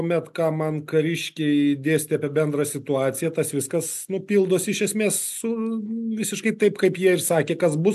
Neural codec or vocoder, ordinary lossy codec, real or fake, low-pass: none; AAC, 96 kbps; real; 14.4 kHz